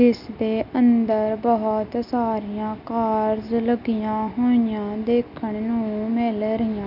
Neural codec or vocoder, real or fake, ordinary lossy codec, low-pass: none; real; none; 5.4 kHz